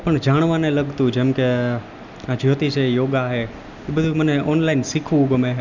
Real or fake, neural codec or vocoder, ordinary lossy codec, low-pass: real; none; none; 7.2 kHz